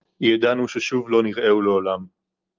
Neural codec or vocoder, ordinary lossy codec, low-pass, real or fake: none; Opus, 24 kbps; 7.2 kHz; real